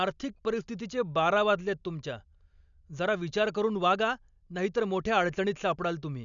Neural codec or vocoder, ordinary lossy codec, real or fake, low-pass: none; none; real; 7.2 kHz